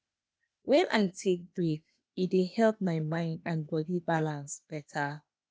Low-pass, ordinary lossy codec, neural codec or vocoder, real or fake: none; none; codec, 16 kHz, 0.8 kbps, ZipCodec; fake